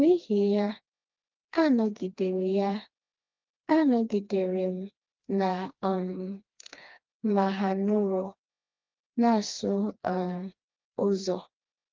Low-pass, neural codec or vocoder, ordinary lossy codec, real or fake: 7.2 kHz; codec, 16 kHz, 2 kbps, FreqCodec, smaller model; Opus, 24 kbps; fake